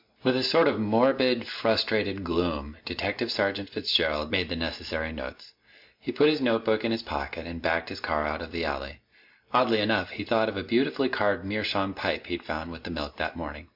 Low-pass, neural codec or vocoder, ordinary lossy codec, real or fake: 5.4 kHz; none; MP3, 48 kbps; real